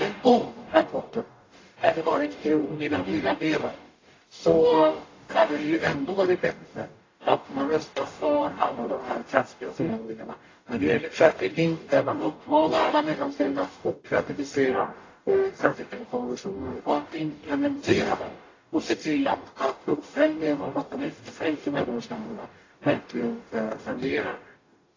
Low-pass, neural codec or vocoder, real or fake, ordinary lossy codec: 7.2 kHz; codec, 44.1 kHz, 0.9 kbps, DAC; fake; AAC, 32 kbps